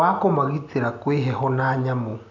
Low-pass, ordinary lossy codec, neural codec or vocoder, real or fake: 7.2 kHz; none; none; real